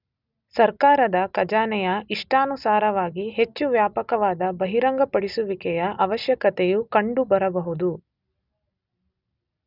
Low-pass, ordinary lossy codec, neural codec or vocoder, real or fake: 5.4 kHz; none; none; real